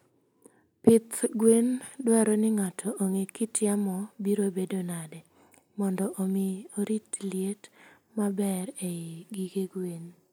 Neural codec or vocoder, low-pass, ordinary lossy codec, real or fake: none; none; none; real